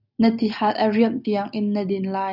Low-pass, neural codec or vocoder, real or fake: 5.4 kHz; none; real